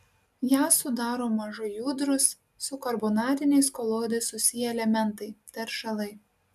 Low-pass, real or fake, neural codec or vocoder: 14.4 kHz; real; none